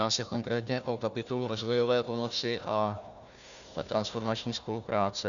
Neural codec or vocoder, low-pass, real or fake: codec, 16 kHz, 1 kbps, FunCodec, trained on Chinese and English, 50 frames a second; 7.2 kHz; fake